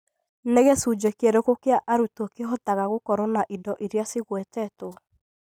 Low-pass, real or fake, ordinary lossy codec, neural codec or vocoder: none; real; none; none